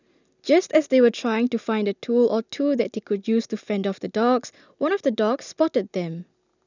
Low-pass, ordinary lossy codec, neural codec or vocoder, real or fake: 7.2 kHz; none; none; real